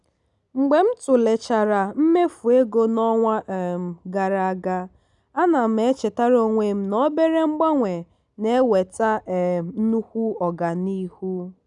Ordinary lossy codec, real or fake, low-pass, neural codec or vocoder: none; real; 10.8 kHz; none